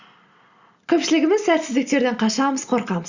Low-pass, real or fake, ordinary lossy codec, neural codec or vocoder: 7.2 kHz; real; Opus, 64 kbps; none